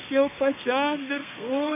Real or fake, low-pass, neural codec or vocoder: fake; 3.6 kHz; codec, 32 kHz, 1.9 kbps, SNAC